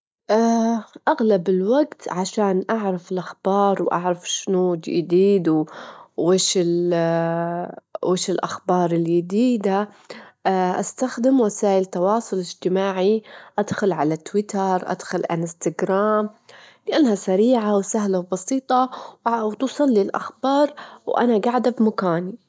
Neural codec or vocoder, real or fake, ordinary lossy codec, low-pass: none; real; none; none